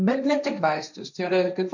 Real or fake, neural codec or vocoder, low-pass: fake; codec, 16 kHz, 1.1 kbps, Voila-Tokenizer; 7.2 kHz